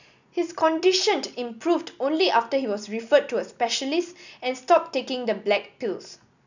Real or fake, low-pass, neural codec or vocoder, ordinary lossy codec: real; 7.2 kHz; none; none